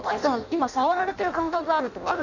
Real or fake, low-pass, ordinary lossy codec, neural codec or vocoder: fake; 7.2 kHz; none; codec, 16 kHz in and 24 kHz out, 0.6 kbps, FireRedTTS-2 codec